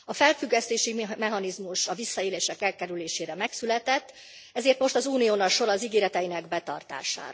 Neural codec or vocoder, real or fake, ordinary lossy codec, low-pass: none; real; none; none